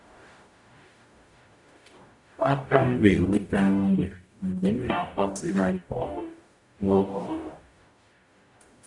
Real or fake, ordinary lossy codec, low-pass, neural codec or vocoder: fake; none; 10.8 kHz; codec, 44.1 kHz, 0.9 kbps, DAC